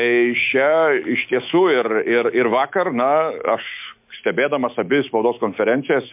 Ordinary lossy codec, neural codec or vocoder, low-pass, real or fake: MP3, 32 kbps; autoencoder, 48 kHz, 128 numbers a frame, DAC-VAE, trained on Japanese speech; 3.6 kHz; fake